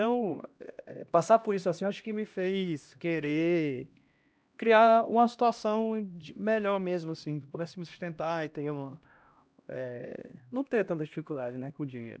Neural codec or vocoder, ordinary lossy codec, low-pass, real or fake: codec, 16 kHz, 1 kbps, X-Codec, HuBERT features, trained on LibriSpeech; none; none; fake